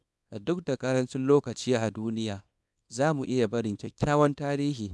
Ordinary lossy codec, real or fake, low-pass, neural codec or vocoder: none; fake; none; codec, 24 kHz, 0.9 kbps, WavTokenizer, small release